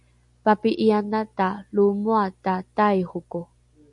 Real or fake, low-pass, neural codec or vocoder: real; 10.8 kHz; none